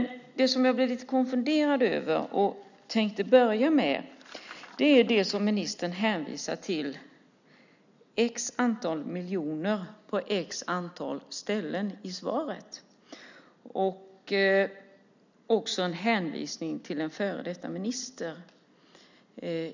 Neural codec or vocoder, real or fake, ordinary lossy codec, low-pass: none; real; none; 7.2 kHz